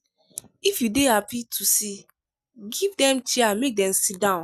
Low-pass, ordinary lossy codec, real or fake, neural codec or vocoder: 14.4 kHz; none; real; none